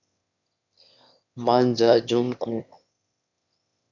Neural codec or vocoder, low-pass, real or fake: autoencoder, 22.05 kHz, a latent of 192 numbers a frame, VITS, trained on one speaker; 7.2 kHz; fake